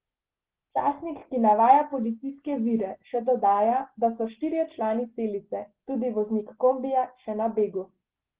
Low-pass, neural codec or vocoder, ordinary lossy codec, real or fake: 3.6 kHz; none; Opus, 16 kbps; real